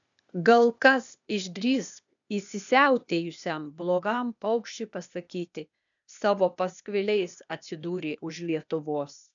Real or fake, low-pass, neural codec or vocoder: fake; 7.2 kHz; codec, 16 kHz, 0.8 kbps, ZipCodec